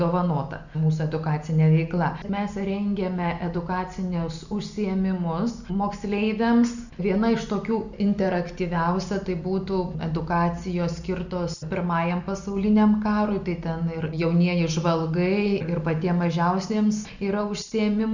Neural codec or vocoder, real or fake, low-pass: none; real; 7.2 kHz